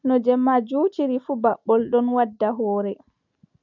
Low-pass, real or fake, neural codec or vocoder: 7.2 kHz; real; none